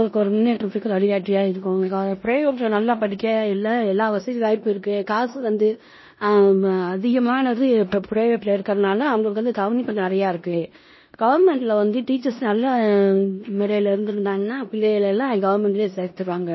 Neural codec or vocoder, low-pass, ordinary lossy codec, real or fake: codec, 16 kHz in and 24 kHz out, 0.9 kbps, LongCat-Audio-Codec, four codebook decoder; 7.2 kHz; MP3, 24 kbps; fake